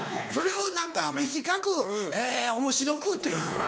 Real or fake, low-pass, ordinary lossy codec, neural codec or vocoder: fake; none; none; codec, 16 kHz, 2 kbps, X-Codec, WavLM features, trained on Multilingual LibriSpeech